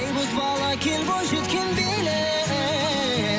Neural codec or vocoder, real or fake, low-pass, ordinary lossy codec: none; real; none; none